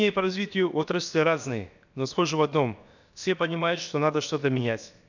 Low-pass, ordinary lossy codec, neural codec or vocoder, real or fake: 7.2 kHz; none; codec, 16 kHz, about 1 kbps, DyCAST, with the encoder's durations; fake